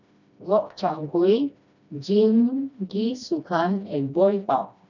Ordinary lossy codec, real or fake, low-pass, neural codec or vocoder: none; fake; 7.2 kHz; codec, 16 kHz, 1 kbps, FreqCodec, smaller model